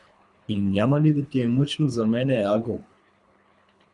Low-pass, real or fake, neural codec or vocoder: 10.8 kHz; fake; codec, 24 kHz, 3 kbps, HILCodec